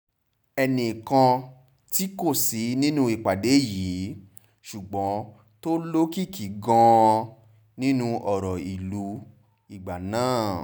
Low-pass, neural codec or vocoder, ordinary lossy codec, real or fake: none; none; none; real